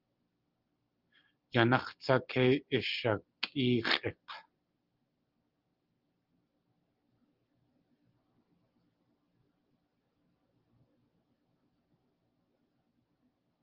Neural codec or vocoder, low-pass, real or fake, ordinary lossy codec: none; 5.4 kHz; real; Opus, 32 kbps